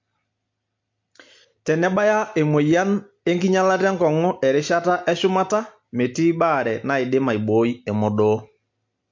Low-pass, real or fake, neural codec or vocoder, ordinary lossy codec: 7.2 kHz; real; none; MP3, 48 kbps